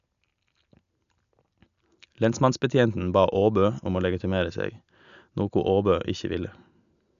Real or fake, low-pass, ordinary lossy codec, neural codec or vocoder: real; 7.2 kHz; none; none